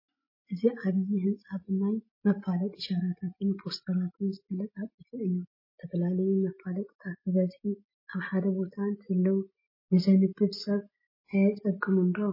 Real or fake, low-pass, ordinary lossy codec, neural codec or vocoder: real; 5.4 kHz; MP3, 24 kbps; none